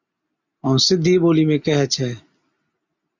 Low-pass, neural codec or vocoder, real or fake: 7.2 kHz; none; real